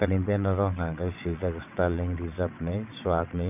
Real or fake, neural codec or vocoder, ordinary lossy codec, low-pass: fake; vocoder, 22.05 kHz, 80 mel bands, WaveNeXt; none; 3.6 kHz